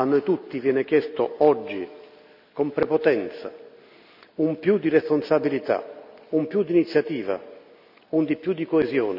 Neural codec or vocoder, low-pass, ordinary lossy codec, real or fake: none; 5.4 kHz; none; real